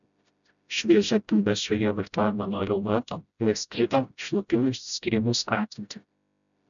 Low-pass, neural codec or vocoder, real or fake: 7.2 kHz; codec, 16 kHz, 0.5 kbps, FreqCodec, smaller model; fake